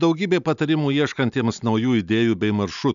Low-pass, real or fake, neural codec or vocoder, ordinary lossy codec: 7.2 kHz; real; none; MP3, 96 kbps